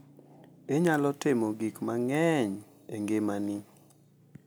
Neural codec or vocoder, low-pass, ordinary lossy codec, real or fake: none; none; none; real